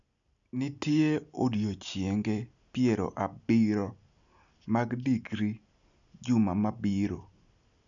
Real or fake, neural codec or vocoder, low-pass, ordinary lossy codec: real; none; 7.2 kHz; none